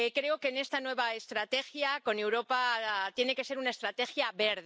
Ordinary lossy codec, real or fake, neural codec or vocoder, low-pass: none; real; none; none